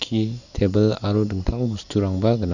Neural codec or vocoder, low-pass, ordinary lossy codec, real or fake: none; 7.2 kHz; none; real